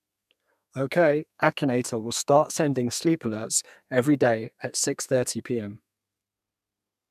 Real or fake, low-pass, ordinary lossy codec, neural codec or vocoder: fake; 14.4 kHz; none; codec, 32 kHz, 1.9 kbps, SNAC